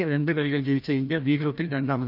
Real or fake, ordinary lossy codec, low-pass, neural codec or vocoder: fake; none; 5.4 kHz; codec, 16 kHz, 1 kbps, FreqCodec, larger model